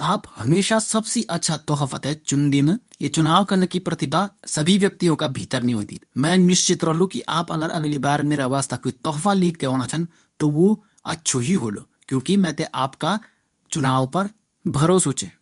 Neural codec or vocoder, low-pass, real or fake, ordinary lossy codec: codec, 24 kHz, 0.9 kbps, WavTokenizer, medium speech release version 2; 10.8 kHz; fake; none